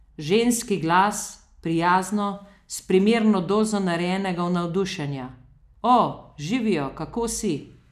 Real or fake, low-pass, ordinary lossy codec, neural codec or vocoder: real; 14.4 kHz; none; none